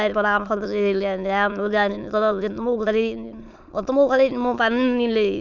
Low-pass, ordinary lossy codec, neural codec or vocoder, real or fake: 7.2 kHz; none; autoencoder, 22.05 kHz, a latent of 192 numbers a frame, VITS, trained on many speakers; fake